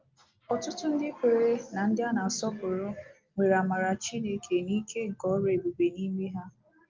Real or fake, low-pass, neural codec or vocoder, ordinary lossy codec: real; 7.2 kHz; none; Opus, 32 kbps